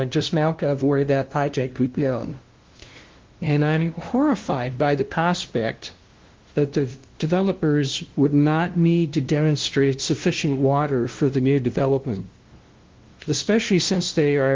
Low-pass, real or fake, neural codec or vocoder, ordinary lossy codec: 7.2 kHz; fake; codec, 16 kHz, 0.5 kbps, FunCodec, trained on LibriTTS, 25 frames a second; Opus, 16 kbps